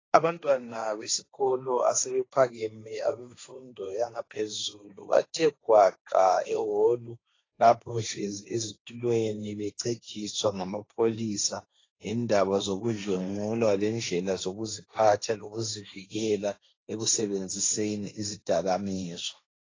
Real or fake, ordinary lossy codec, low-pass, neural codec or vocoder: fake; AAC, 32 kbps; 7.2 kHz; codec, 16 kHz, 1.1 kbps, Voila-Tokenizer